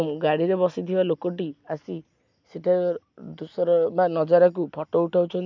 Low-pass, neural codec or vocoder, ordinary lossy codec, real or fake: 7.2 kHz; none; MP3, 64 kbps; real